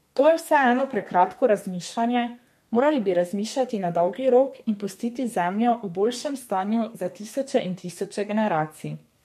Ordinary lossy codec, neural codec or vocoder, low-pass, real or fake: MP3, 64 kbps; codec, 32 kHz, 1.9 kbps, SNAC; 14.4 kHz; fake